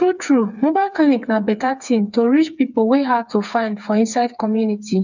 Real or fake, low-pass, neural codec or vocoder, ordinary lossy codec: fake; 7.2 kHz; codec, 16 kHz, 4 kbps, FreqCodec, smaller model; none